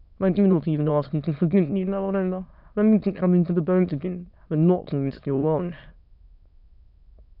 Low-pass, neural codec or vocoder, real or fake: 5.4 kHz; autoencoder, 22.05 kHz, a latent of 192 numbers a frame, VITS, trained on many speakers; fake